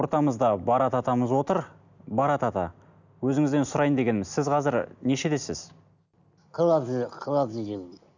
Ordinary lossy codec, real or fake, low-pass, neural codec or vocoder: none; real; 7.2 kHz; none